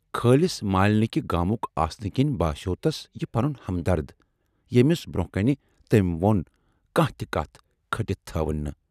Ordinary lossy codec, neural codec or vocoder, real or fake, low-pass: none; none; real; 14.4 kHz